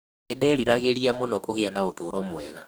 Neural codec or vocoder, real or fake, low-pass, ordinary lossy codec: codec, 44.1 kHz, 2.6 kbps, DAC; fake; none; none